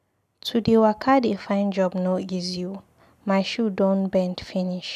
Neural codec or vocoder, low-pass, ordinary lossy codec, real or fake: none; 14.4 kHz; none; real